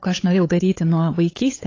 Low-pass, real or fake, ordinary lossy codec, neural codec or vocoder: 7.2 kHz; fake; AAC, 32 kbps; codec, 16 kHz, 8 kbps, FunCodec, trained on LibriTTS, 25 frames a second